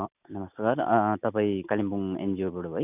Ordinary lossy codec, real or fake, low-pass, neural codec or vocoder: none; real; 3.6 kHz; none